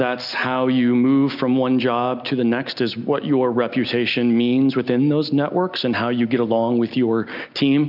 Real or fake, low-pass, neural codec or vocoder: real; 5.4 kHz; none